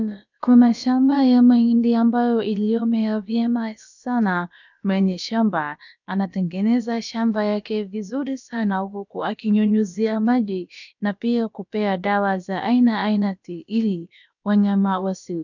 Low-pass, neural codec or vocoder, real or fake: 7.2 kHz; codec, 16 kHz, about 1 kbps, DyCAST, with the encoder's durations; fake